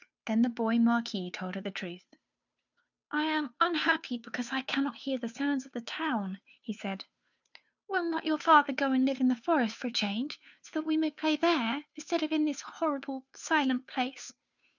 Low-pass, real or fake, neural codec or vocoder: 7.2 kHz; fake; codec, 16 kHz, 2 kbps, FunCodec, trained on Chinese and English, 25 frames a second